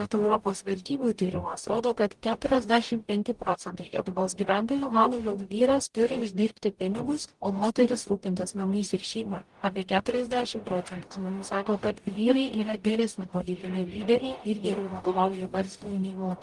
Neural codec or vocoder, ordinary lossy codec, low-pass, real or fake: codec, 44.1 kHz, 0.9 kbps, DAC; Opus, 24 kbps; 10.8 kHz; fake